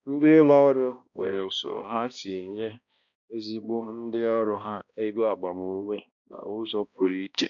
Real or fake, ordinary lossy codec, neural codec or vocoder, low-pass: fake; none; codec, 16 kHz, 1 kbps, X-Codec, HuBERT features, trained on balanced general audio; 7.2 kHz